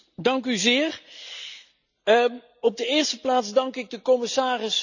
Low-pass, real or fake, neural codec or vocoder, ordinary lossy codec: 7.2 kHz; real; none; none